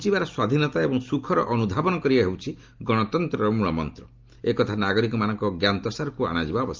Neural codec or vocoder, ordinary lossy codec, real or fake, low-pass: none; Opus, 32 kbps; real; 7.2 kHz